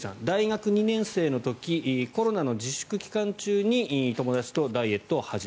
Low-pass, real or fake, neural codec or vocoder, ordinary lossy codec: none; real; none; none